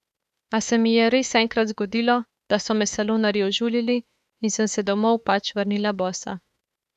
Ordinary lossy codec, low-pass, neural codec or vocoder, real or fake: none; 14.4 kHz; autoencoder, 48 kHz, 32 numbers a frame, DAC-VAE, trained on Japanese speech; fake